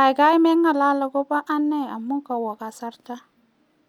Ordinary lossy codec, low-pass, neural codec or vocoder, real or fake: none; 19.8 kHz; none; real